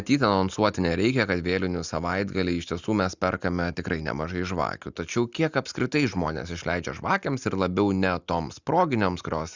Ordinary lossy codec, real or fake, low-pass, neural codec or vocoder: Opus, 64 kbps; real; 7.2 kHz; none